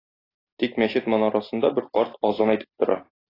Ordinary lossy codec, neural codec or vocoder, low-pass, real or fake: AAC, 24 kbps; none; 5.4 kHz; real